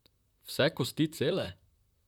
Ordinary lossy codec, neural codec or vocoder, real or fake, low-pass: none; vocoder, 44.1 kHz, 128 mel bands, Pupu-Vocoder; fake; 19.8 kHz